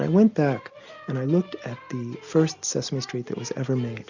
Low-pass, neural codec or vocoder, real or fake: 7.2 kHz; none; real